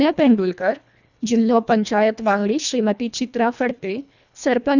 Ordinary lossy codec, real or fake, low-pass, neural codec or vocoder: none; fake; 7.2 kHz; codec, 24 kHz, 1.5 kbps, HILCodec